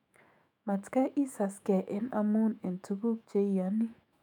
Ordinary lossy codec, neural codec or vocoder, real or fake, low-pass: none; autoencoder, 48 kHz, 128 numbers a frame, DAC-VAE, trained on Japanese speech; fake; 19.8 kHz